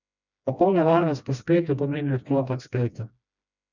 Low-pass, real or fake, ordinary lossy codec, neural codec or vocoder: 7.2 kHz; fake; none; codec, 16 kHz, 1 kbps, FreqCodec, smaller model